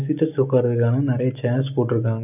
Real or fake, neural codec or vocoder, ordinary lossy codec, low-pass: real; none; none; 3.6 kHz